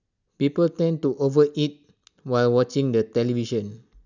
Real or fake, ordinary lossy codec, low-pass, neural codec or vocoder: real; none; 7.2 kHz; none